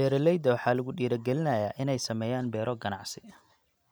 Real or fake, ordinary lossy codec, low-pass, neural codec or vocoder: real; none; none; none